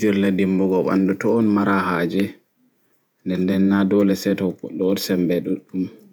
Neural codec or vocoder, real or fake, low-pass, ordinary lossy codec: vocoder, 48 kHz, 128 mel bands, Vocos; fake; none; none